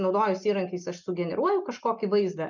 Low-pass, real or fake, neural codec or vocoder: 7.2 kHz; real; none